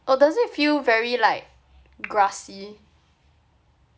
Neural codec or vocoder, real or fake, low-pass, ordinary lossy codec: none; real; none; none